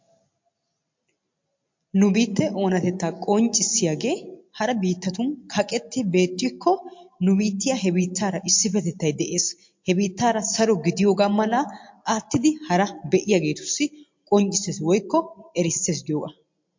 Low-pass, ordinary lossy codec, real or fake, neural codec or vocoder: 7.2 kHz; MP3, 48 kbps; real; none